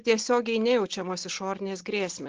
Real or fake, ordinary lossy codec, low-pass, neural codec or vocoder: real; Opus, 16 kbps; 7.2 kHz; none